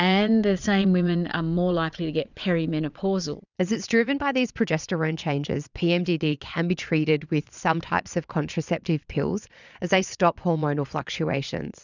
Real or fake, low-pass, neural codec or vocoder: fake; 7.2 kHz; vocoder, 22.05 kHz, 80 mel bands, Vocos